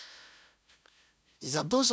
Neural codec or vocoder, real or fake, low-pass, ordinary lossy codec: codec, 16 kHz, 0.5 kbps, FunCodec, trained on LibriTTS, 25 frames a second; fake; none; none